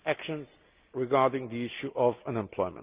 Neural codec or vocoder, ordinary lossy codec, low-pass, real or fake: none; Opus, 24 kbps; 3.6 kHz; real